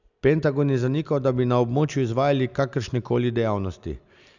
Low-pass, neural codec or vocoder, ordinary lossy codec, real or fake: 7.2 kHz; none; none; real